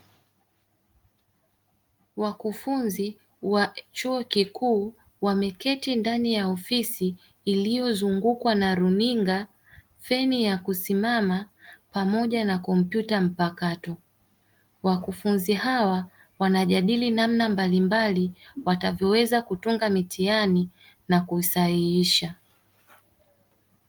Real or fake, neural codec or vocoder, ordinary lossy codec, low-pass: real; none; Opus, 24 kbps; 19.8 kHz